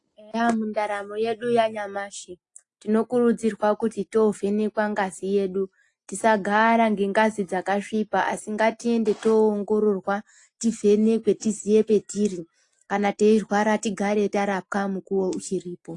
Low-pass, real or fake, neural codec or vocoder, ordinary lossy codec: 10.8 kHz; real; none; AAC, 48 kbps